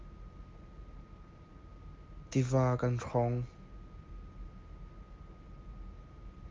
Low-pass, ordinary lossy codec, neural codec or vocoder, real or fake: 7.2 kHz; Opus, 32 kbps; none; real